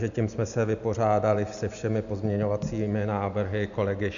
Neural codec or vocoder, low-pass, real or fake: none; 7.2 kHz; real